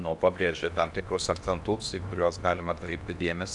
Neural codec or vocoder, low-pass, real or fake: codec, 16 kHz in and 24 kHz out, 0.8 kbps, FocalCodec, streaming, 65536 codes; 10.8 kHz; fake